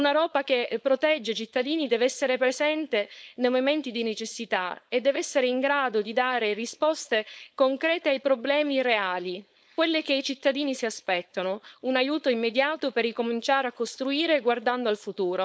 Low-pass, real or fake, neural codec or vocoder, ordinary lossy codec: none; fake; codec, 16 kHz, 4.8 kbps, FACodec; none